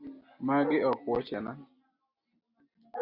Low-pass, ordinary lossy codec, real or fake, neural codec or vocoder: 5.4 kHz; Opus, 64 kbps; real; none